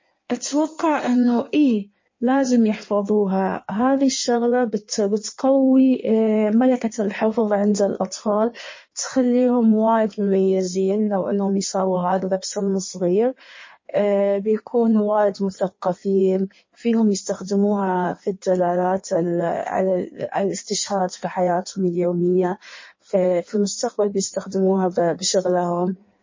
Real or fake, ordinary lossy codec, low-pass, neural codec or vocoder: fake; MP3, 32 kbps; 7.2 kHz; codec, 16 kHz in and 24 kHz out, 1.1 kbps, FireRedTTS-2 codec